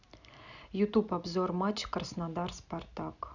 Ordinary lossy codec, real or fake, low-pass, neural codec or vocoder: none; real; 7.2 kHz; none